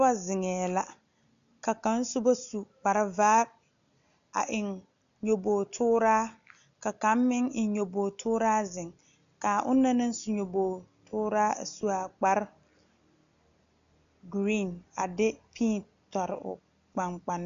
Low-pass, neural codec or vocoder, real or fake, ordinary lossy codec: 7.2 kHz; none; real; MP3, 64 kbps